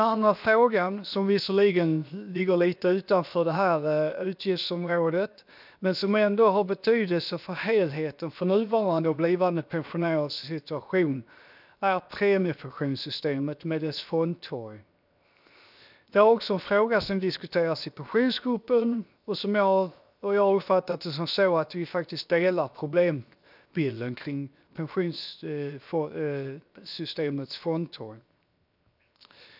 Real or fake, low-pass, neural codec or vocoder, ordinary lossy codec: fake; 5.4 kHz; codec, 16 kHz, 0.7 kbps, FocalCodec; none